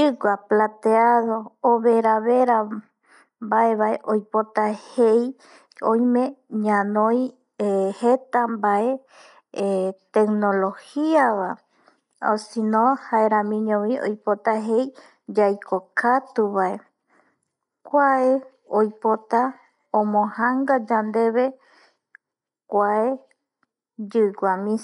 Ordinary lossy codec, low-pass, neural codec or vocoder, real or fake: none; 10.8 kHz; none; real